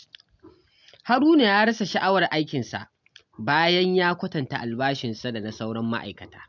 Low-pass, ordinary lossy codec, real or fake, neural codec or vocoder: 7.2 kHz; none; real; none